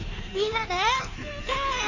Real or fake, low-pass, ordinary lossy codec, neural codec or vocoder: fake; 7.2 kHz; none; codec, 16 kHz in and 24 kHz out, 1.1 kbps, FireRedTTS-2 codec